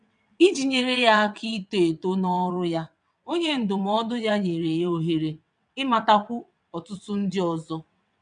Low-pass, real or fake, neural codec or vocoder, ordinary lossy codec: 9.9 kHz; fake; vocoder, 22.05 kHz, 80 mel bands, WaveNeXt; none